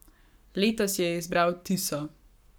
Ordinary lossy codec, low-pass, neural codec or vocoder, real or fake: none; none; codec, 44.1 kHz, 7.8 kbps, Pupu-Codec; fake